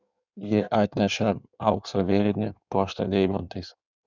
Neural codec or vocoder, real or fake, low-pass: codec, 16 kHz in and 24 kHz out, 1.1 kbps, FireRedTTS-2 codec; fake; 7.2 kHz